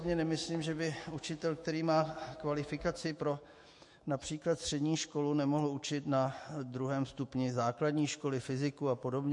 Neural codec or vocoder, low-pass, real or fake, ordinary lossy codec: autoencoder, 48 kHz, 128 numbers a frame, DAC-VAE, trained on Japanese speech; 10.8 kHz; fake; MP3, 48 kbps